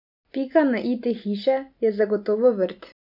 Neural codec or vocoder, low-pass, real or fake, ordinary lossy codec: none; 5.4 kHz; real; none